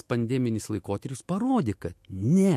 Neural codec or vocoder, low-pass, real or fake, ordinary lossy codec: autoencoder, 48 kHz, 128 numbers a frame, DAC-VAE, trained on Japanese speech; 14.4 kHz; fake; MP3, 64 kbps